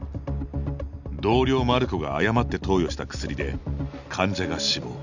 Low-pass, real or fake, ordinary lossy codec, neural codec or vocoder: 7.2 kHz; real; none; none